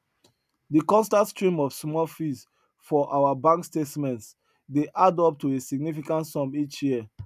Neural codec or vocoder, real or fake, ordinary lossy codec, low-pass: none; real; none; 14.4 kHz